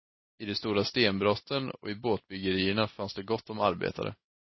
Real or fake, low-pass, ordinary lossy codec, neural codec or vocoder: real; 7.2 kHz; MP3, 24 kbps; none